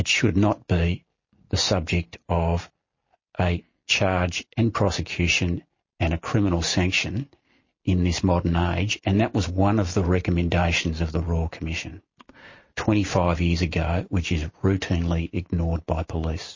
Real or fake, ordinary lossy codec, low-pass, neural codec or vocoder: real; MP3, 32 kbps; 7.2 kHz; none